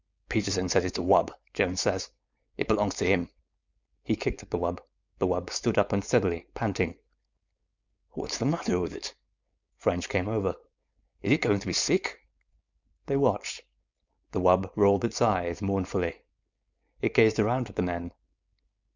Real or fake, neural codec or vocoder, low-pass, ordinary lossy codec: fake; codec, 16 kHz, 4.8 kbps, FACodec; 7.2 kHz; Opus, 64 kbps